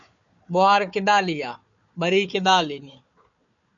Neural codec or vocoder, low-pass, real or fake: codec, 16 kHz, 4 kbps, FunCodec, trained on Chinese and English, 50 frames a second; 7.2 kHz; fake